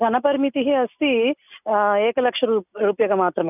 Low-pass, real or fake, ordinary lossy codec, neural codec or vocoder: 3.6 kHz; real; none; none